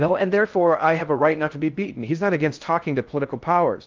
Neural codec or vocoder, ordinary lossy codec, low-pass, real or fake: codec, 16 kHz in and 24 kHz out, 0.6 kbps, FocalCodec, streaming, 4096 codes; Opus, 32 kbps; 7.2 kHz; fake